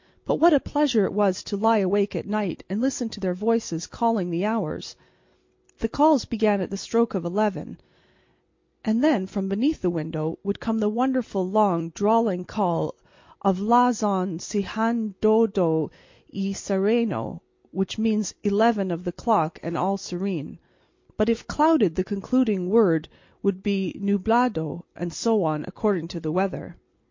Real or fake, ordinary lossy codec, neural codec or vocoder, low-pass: real; MP3, 48 kbps; none; 7.2 kHz